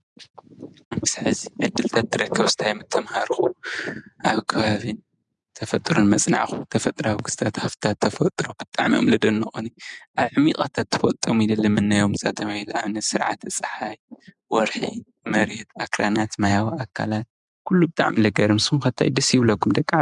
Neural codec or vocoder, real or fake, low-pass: vocoder, 44.1 kHz, 128 mel bands every 512 samples, BigVGAN v2; fake; 10.8 kHz